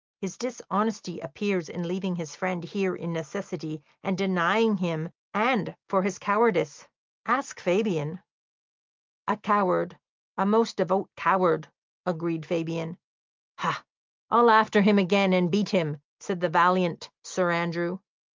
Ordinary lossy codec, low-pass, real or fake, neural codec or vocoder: Opus, 24 kbps; 7.2 kHz; real; none